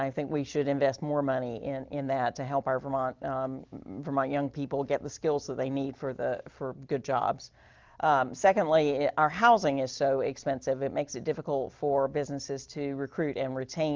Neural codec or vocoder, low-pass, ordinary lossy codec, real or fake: none; 7.2 kHz; Opus, 24 kbps; real